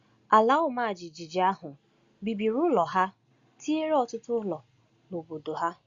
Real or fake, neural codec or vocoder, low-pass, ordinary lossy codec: real; none; 7.2 kHz; Opus, 64 kbps